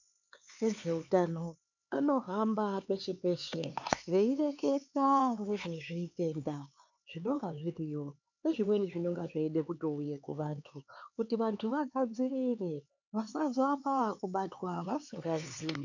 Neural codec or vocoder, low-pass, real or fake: codec, 16 kHz, 4 kbps, X-Codec, HuBERT features, trained on LibriSpeech; 7.2 kHz; fake